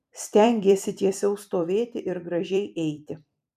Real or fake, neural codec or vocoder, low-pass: fake; vocoder, 44.1 kHz, 128 mel bands every 256 samples, BigVGAN v2; 14.4 kHz